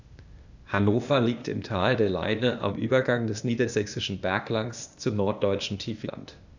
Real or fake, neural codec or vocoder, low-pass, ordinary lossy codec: fake; codec, 16 kHz, 0.8 kbps, ZipCodec; 7.2 kHz; none